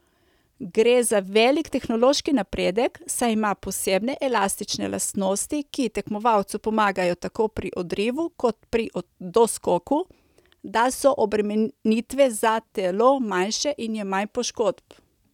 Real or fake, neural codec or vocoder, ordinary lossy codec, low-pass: real; none; none; 19.8 kHz